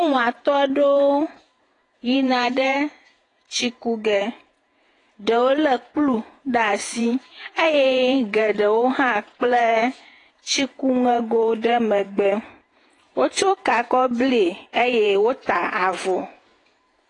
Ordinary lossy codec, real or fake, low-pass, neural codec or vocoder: AAC, 32 kbps; fake; 10.8 kHz; vocoder, 48 kHz, 128 mel bands, Vocos